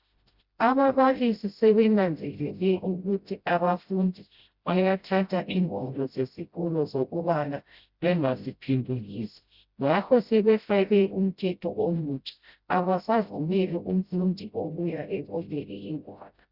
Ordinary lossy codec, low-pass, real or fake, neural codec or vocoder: Opus, 64 kbps; 5.4 kHz; fake; codec, 16 kHz, 0.5 kbps, FreqCodec, smaller model